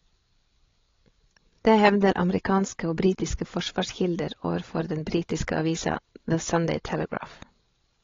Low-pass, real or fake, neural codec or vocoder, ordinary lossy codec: 7.2 kHz; fake; codec, 16 kHz, 16 kbps, FreqCodec, larger model; AAC, 32 kbps